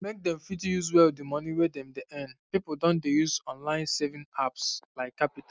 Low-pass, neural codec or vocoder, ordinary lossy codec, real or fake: none; none; none; real